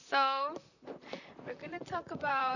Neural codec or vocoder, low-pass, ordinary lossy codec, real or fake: vocoder, 44.1 kHz, 128 mel bands, Pupu-Vocoder; 7.2 kHz; none; fake